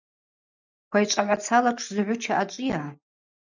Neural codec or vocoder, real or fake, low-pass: none; real; 7.2 kHz